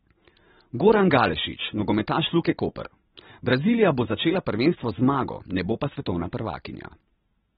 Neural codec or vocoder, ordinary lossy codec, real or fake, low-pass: none; AAC, 16 kbps; real; 7.2 kHz